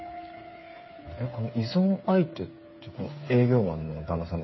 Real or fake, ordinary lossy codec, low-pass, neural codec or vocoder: fake; MP3, 24 kbps; 7.2 kHz; codec, 16 kHz, 8 kbps, FreqCodec, smaller model